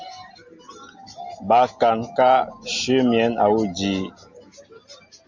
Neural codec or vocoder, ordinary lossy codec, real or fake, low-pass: none; MP3, 64 kbps; real; 7.2 kHz